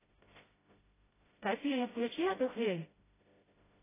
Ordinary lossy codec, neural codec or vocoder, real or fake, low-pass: AAC, 16 kbps; codec, 16 kHz, 0.5 kbps, FreqCodec, smaller model; fake; 3.6 kHz